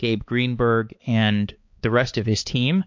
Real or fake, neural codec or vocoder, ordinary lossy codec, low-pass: fake; codec, 16 kHz, 4 kbps, X-Codec, HuBERT features, trained on balanced general audio; MP3, 48 kbps; 7.2 kHz